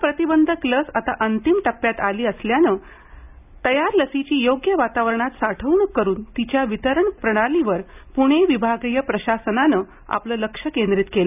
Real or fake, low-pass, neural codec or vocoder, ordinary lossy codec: real; 3.6 kHz; none; none